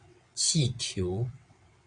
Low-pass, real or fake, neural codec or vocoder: 9.9 kHz; fake; vocoder, 22.05 kHz, 80 mel bands, WaveNeXt